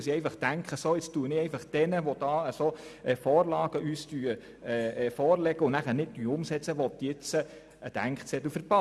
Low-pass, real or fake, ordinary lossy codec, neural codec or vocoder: none; real; none; none